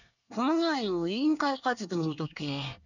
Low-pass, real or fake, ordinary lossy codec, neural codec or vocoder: 7.2 kHz; fake; none; codec, 24 kHz, 1 kbps, SNAC